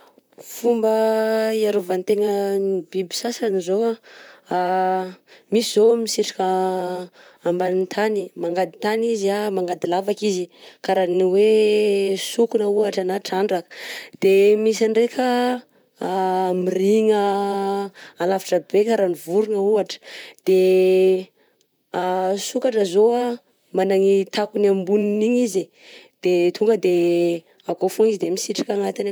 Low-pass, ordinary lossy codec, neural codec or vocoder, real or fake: none; none; vocoder, 44.1 kHz, 128 mel bands, Pupu-Vocoder; fake